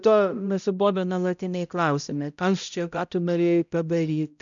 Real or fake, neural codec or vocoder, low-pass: fake; codec, 16 kHz, 0.5 kbps, X-Codec, HuBERT features, trained on balanced general audio; 7.2 kHz